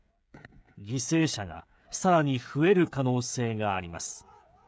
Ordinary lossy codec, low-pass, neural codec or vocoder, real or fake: none; none; codec, 16 kHz, 16 kbps, FreqCodec, smaller model; fake